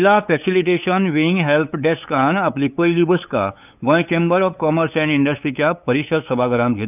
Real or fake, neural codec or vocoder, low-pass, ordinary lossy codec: fake; codec, 16 kHz, 8 kbps, FunCodec, trained on LibriTTS, 25 frames a second; 3.6 kHz; none